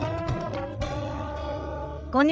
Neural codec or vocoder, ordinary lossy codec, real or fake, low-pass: codec, 16 kHz, 8 kbps, FreqCodec, larger model; none; fake; none